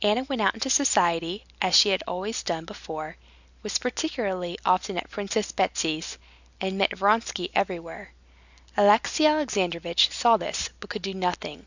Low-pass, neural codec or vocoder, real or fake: 7.2 kHz; none; real